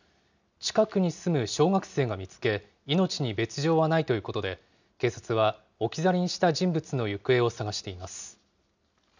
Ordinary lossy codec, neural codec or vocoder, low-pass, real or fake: none; none; 7.2 kHz; real